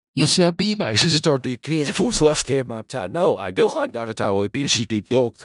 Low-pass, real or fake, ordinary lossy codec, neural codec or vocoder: 10.8 kHz; fake; none; codec, 16 kHz in and 24 kHz out, 0.4 kbps, LongCat-Audio-Codec, four codebook decoder